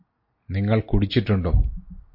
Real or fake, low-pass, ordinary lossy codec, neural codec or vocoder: real; 5.4 kHz; MP3, 32 kbps; none